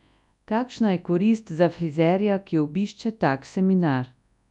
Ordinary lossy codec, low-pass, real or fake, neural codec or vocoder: none; 10.8 kHz; fake; codec, 24 kHz, 0.9 kbps, WavTokenizer, large speech release